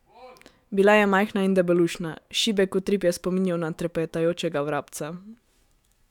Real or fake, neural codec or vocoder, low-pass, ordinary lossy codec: fake; autoencoder, 48 kHz, 128 numbers a frame, DAC-VAE, trained on Japanese speech; 19.8 kHz; none